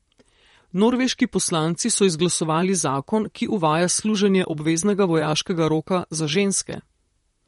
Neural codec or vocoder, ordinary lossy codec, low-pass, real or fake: vocoder, 44.1 kHz, 128 mel bands, Pupu-Vocoder; MP3, 48 kbps; 19.8 kHz; fake